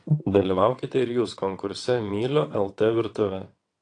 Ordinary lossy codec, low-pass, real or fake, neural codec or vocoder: AAC, 48 kbps; 9.9 kHz; fake; vocoder, 22.05 kHz, 80 mel bands, WaveNeXt